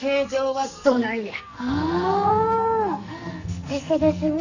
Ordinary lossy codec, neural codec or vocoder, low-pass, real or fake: none; codec, 44.1 kHz, 2.6 kbps, SNAC; 7.2 kHz; fake